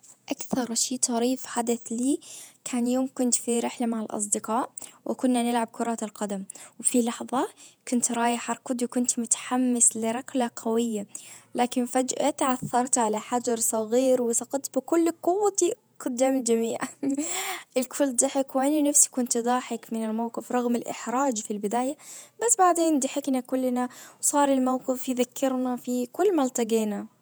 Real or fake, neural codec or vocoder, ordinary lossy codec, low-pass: fake; vocoder, 48 kHz, 128 mel bands, Vocos; none; none